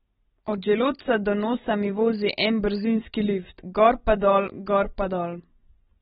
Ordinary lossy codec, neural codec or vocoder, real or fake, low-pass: AAC, 16 kbps; none; real; 19.8 kHz